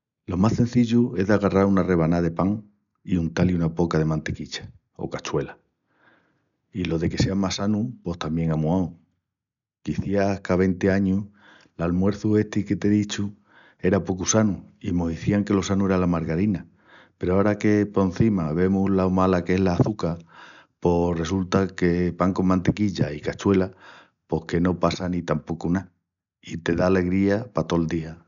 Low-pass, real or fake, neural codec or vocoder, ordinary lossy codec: 7.2 kHz; real; none; none